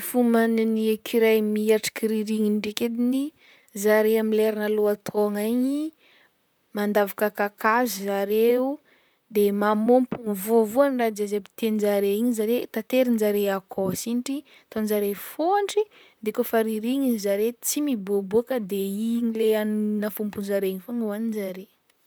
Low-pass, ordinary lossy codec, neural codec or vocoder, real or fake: none; none; vocoder, 44.1 kHz, 128 mel bands, Pupu-Vocoder; fake